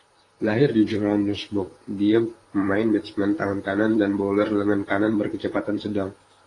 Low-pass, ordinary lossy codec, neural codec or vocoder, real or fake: 10.8 kHz; AAC, 32 kbps; vocoder, 44.1 kHz, 128 mel bands, Pupu-Vocoder; fake